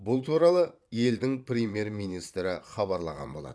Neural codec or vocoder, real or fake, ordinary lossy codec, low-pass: vocoder, 22.05 kHz, 80 mel bands, Vocos; fake; none; none